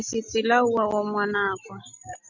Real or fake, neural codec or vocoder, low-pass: real; none; 7.2 kHz